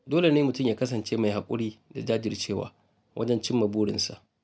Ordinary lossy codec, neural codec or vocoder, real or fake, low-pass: none; none; real; none